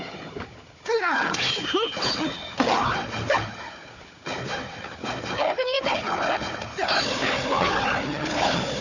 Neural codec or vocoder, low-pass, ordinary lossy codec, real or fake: codec, 16 kHz, 4 kbps, FunCodec, trained on Chinese and English, 50 frames a second; 7.2 kHz; none; fake